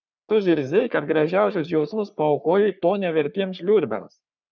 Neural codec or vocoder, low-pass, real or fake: codec, 44.1 kHz, 3.4 kbps, Pupu-Codec; 7.2 kHz; fake